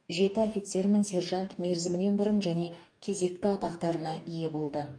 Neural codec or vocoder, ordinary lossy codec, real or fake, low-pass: codec, 44.1 kHz, 2.6 kbps, DAC; none; fake; 9.9 kHz